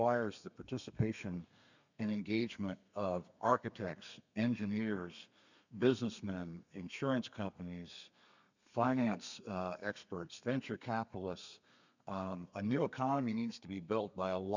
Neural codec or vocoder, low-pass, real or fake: codec, 32 kHz, 1.9 kbps, SNAC; 7.2 kHz; fake